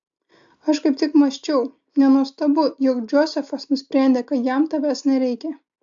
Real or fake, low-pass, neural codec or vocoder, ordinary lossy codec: real; 7.2 kHz; none; AAC, 64 kbps